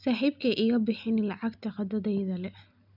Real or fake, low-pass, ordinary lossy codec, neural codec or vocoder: real; 5.4 kHz; none; none